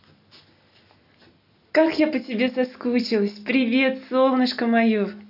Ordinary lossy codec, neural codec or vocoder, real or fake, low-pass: none; none; real; 5.4 kHz